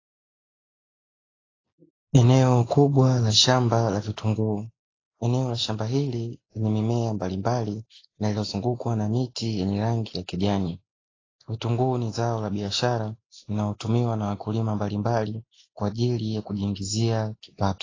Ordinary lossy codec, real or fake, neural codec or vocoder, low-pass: AAC, 32 kbps; real; none; 7.2 kHz